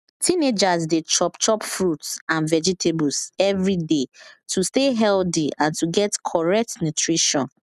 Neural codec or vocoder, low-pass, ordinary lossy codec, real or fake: none; 14.4 kHz; none; real